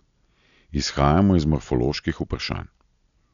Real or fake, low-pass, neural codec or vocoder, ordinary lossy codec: real; 7.2 kHz; none; none